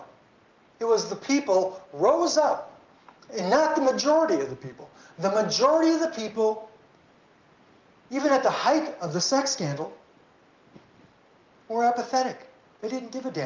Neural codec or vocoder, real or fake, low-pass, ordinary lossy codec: none; real; 7.2 kHz; Opus, 32 kbps